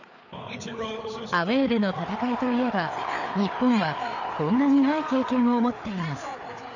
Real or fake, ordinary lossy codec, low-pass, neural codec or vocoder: fake; none; 7.2 kHz; codec, 16 kHz, 4 kbps, FreqCodec, larger model